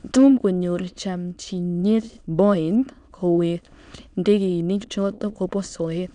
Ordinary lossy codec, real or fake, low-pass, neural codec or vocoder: none; fake; 9.9 kHz; autoencoder, 22.05 kHz, a latent of 192 numbers a frame, VITS, trained on many speakers